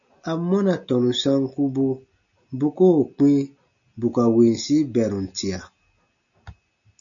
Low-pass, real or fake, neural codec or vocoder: 7.2 kHz; real; none